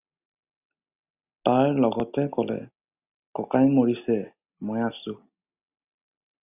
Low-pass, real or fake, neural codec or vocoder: 3.6 kHz; real; none